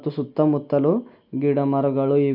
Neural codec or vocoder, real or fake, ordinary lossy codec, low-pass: none; real; none; 5.4 kHz